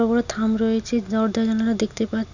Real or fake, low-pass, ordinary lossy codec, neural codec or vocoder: real; 7.2 kHz; none; none